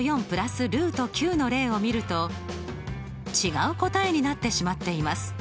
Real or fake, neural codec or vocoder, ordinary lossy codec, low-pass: real; none; none; none